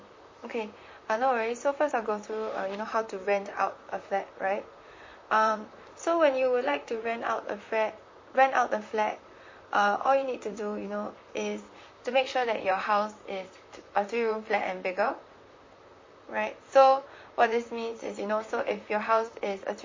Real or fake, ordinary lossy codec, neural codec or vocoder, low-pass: fake; MP3, 32 kbps; vocoder, 44.1 kHz, 128 mel bands, Pupu-Vocoder; 7.2 kHz